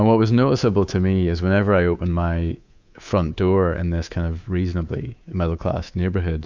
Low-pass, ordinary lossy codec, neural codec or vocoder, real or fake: 7.2 kHz; Opus, 64 kbps; codec, 24 kHz, 3.1 kbps, DualCodec; fake